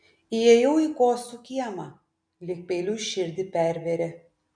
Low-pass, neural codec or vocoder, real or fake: 9.9 kHz; none; real